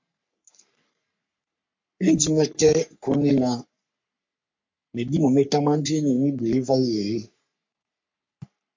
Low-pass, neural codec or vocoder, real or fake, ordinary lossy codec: 7.2 kHz; codec, 44.1 kHz, 3.4 kbps, Pupu-Codec; fake; MP3, 48 kbps